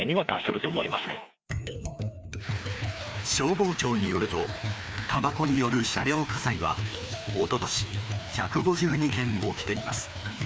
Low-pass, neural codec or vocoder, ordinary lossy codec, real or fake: none; codec, 16 kHz, 2 kbps, FreqCodec, larger model; none; fake